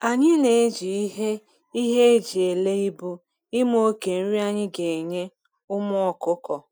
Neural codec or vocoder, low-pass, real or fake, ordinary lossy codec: none; 19.8 kHz; real; none